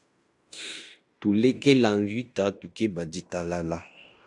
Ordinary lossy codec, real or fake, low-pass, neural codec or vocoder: AAC, 64 kbps; fake; 10.8 kHz; codec, 16 kHz in and 24 kHz out, 0.9 kbps, LongCat-Audio-Codec, fine tuned four codebook decoder